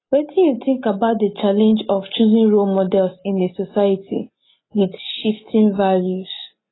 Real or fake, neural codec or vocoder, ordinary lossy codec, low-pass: real; none; AAC, 16 kbps; 7.2 kHz